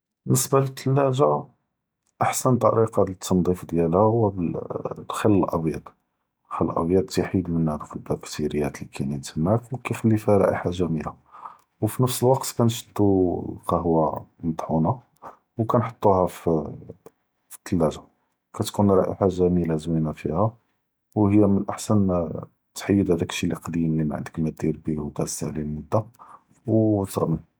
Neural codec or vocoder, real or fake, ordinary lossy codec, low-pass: none; real; none; none